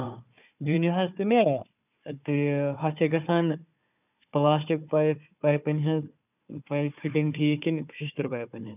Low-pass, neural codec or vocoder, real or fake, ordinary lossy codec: 3.6 kHz; codec, 16 kHz, 4 kbps, FunCodec, trained on Chinese and English, 50 frames a second; fake; none